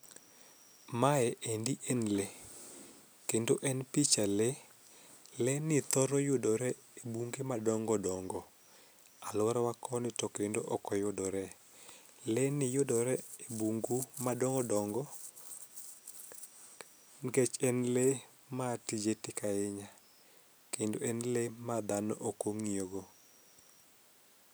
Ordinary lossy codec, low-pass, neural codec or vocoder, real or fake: none; none; none; real